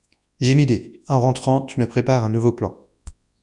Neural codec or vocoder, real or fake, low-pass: codec, 24 kHz, 0.9 kbps, WavTokenizer, large speech release; fake; 10.8 kHz